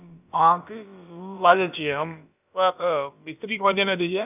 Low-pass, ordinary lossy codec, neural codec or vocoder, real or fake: 3.6 kHz; none; codec, 16 kHz, about 1 kbps, DyCAST, with the encoder's durations; fake